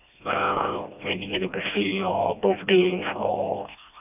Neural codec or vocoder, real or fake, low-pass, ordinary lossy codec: codec, 16 kHz, 1 kbps, FreqCodec, smaller model; fake; 3.6 kHz; none